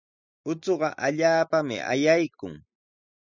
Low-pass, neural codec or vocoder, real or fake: 7.2 kHz; none; real